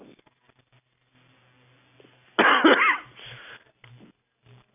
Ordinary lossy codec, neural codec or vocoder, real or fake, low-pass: none; none; real; 3.6 kHz